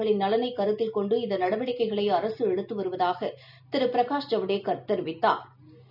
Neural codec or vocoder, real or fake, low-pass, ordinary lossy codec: none; real; 5.4 kHz; none